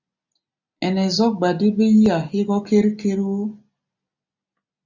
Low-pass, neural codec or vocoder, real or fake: 7.2 kHz; none; real